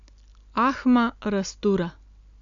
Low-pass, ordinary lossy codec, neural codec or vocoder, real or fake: 7.2 kHz; none; none; real